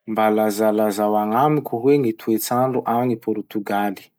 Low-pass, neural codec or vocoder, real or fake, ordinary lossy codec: none; none; real; none